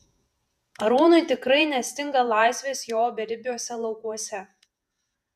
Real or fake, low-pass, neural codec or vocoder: fake; 14.4 kHz; vocoder, 48 kHz, 128 mel bands, Vocos